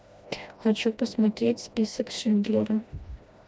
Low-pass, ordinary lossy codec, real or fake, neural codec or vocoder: none; none; fake; codec, 16 kHz, 1 kbps, FreqCodec, smaller model